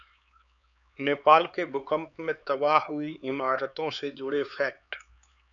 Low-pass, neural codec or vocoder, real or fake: 7.2 kHz; codec, 16 kHz, 4 kbps, X-Codec, HuBERT features, trained on LibriSpeech; fake